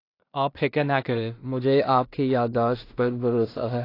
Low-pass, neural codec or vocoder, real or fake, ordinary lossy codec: 5.4 kHz; codec, 16 kHz in and 24 kHz out, 0.4 kbps, LongCat-Audio-Codec, two codebook decoder; fake; AAC, 32 kbps